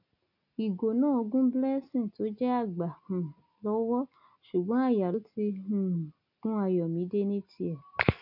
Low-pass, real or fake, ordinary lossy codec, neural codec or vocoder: 5.4 kHz; real; none; none